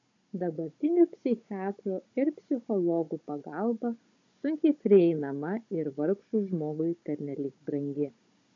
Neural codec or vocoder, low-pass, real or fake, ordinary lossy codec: codec, 16 kHz, 16 kbps, FunCodec, trained on Chinese and English, 50 frames a second; 7.2 kHz; fake; AAC, 48 kbps